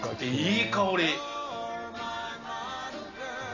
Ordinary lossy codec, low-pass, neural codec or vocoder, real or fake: none; 7.2 kHz; none; real